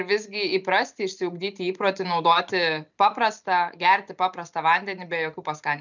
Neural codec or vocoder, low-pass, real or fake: none; 7.2 kHz; real